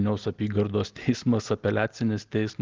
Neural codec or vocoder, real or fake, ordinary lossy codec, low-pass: none; real; Opus, 16 kbps; 7.2 kHz